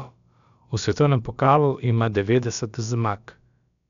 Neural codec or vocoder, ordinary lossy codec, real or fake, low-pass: codec, 16 kHz, about 1 kbps, DyCAST, with the encoder's durations; none; fake; 7.2 kHz